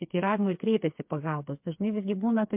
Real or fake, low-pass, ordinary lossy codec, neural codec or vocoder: fake; 3.6 kHz; MP3, 32 kbps; codec, 16 kHz, 4 kbps, FreqCodec, smaller model